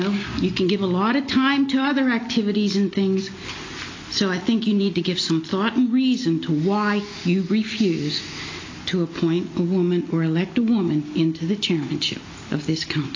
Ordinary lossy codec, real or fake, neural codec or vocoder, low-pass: AAC, 32 kbps; real; none; 7.2 kHz